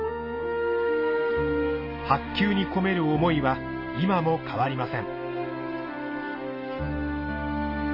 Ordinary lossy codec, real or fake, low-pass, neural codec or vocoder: MP3, 24 kbps; real; 5.4 kHz; none